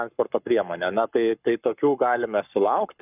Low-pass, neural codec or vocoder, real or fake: 3.6 kHz; codec, 44.1 kHz, 7.8 kbps, Pupu-Codec; fake